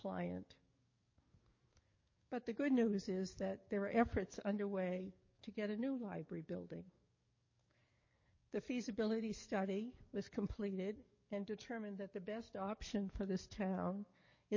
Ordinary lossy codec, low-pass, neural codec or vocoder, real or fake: MP3, 32 kbps; 7.2 kHz; codec, 44.1 kHz, 7.8 kbps, DAC; fake